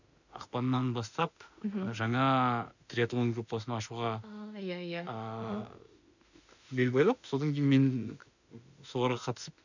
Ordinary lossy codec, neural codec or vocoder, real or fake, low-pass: none; autoencoder, 48 kHz, 32 numbers a frame, DAC-VAE, trained on Japanese speech; fake; 7.2 kHz